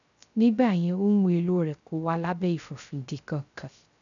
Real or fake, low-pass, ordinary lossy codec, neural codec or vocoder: fake; 7.2 kHz; none; codec, 16 kHz, 0.3 kbps, FocalCodec